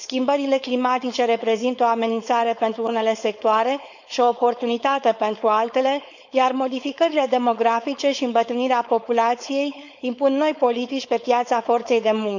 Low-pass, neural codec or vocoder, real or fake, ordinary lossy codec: 7.2 kHz; codec, 16 kHz, 4.8 kbps, FACodec; fake; none